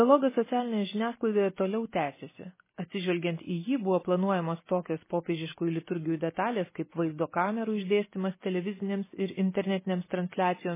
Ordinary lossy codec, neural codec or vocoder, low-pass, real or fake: MP3, 16 kbps; none; 3.6 kHz; real